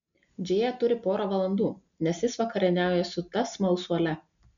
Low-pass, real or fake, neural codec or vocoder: 7.2 kHz; real; none